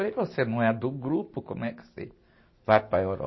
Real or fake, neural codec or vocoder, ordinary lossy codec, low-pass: real; none; MP3, 24 kbps; 7.2 kHz